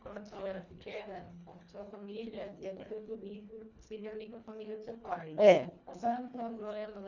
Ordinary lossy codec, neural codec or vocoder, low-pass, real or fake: none; codec, 24 kHz, 1.5 kbps, HILCodec; 7.2 kHz; fake